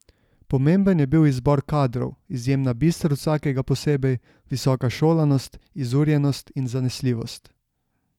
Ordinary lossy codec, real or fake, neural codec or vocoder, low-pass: none; real; none; 19.8 kHz